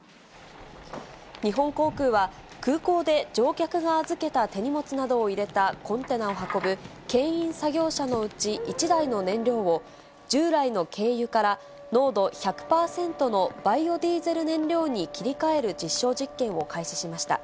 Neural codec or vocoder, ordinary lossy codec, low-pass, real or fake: none; none; none; real